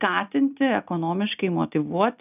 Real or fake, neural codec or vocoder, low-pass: real; none; 3.6 kHz